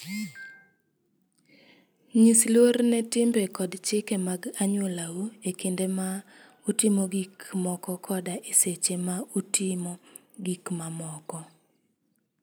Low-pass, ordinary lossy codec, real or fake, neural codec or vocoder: none; none; real; none